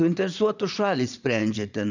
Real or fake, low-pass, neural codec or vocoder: fake; 7.2 kHz; vocoder, 22.05 kHz, 80 mel bands, Vocos